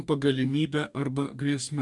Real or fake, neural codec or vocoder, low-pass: fake; codec, 44.1 kHz, 2.6 kbps, DAC; 10.8 kHz